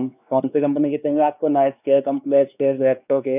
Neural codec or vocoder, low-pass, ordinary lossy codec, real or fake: codec, 16 kHz, 2 kbps, X-Codec, WavLM features, trained on Multilingual LibriSpeech; 3.6 kHz; none; fake